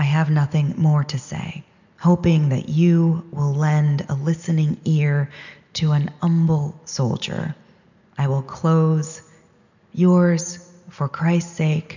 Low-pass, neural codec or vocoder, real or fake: 7.2 kHz; none; real